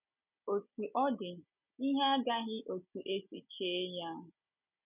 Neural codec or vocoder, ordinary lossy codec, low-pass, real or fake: none; none; 3.6 kHz; real